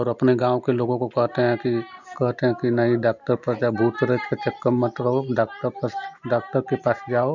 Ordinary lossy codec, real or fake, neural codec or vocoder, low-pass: none; real; none; 7.2 kHz